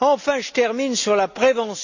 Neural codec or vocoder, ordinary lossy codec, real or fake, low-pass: none; none; real; 7.2 kHz